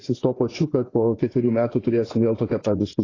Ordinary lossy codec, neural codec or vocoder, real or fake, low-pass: AAC, 32 kbps; vocoder, 24 kHz, 100 mel bands, Vocos; fake; 7.2 kHz